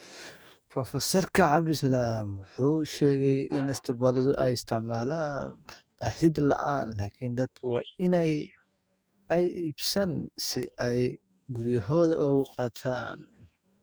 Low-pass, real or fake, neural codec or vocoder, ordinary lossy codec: none; fake; codec, 44.1 kHz, 2.6 kbps, DAC; none